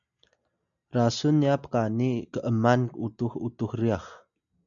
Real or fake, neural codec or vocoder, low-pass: real; none; 7.2 kHz